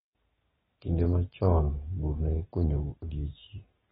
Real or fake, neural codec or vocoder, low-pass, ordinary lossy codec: real; none; 7.2 kHz; AAC, 16 kbps